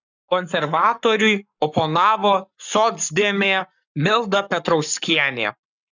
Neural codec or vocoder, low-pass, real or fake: codec, 44.1 kHz, 7.8 kbps, Pupu-Codec; 7.2 kHz; fake